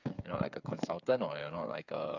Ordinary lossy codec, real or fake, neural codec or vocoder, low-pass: none; fake; codec, 16 kHz, 8 kbps, FreqCodec, smaller model; 7.2 kHz